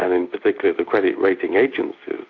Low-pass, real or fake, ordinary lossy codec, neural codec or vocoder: 7.2 kHz; real; AAC, 48 kbps; none